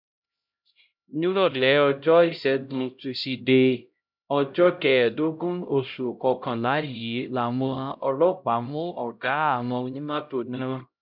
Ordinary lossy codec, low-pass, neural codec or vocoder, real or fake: none; 5.4 kHz; codec, 16 kHz, 0.5 kbps, X-Codec, HuBERT features, trained on LibriSpeech; fake